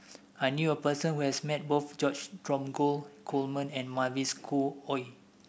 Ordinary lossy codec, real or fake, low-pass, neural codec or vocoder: none; real; none; none